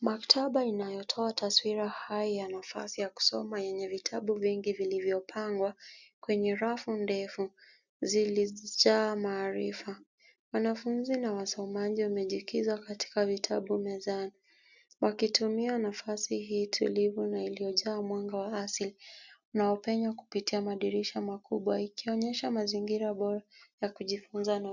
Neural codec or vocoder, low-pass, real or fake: none; 7.2 kHz; real